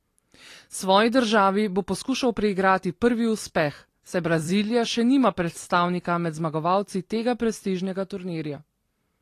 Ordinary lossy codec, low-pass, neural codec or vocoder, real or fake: AAC, 48 kbps; 14.4 kHz; vocoder, 44.1 kHz, 128 mel bands every 256 samples, BigVGAN v2; fake